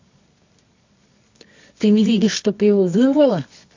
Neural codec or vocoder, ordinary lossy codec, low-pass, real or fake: codec, 24 kHz, 0.9 kbps, WavTokenizer, medium music audio release; AAC, 48 kbps; 7.2 kHz; fake